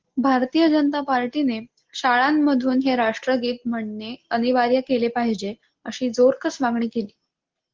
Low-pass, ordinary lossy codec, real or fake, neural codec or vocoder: 7.2 kHz; Opus, 16 kbps; real; none